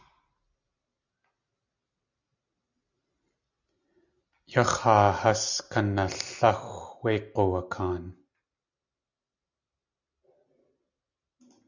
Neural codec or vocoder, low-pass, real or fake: none; 7.2 kHz; real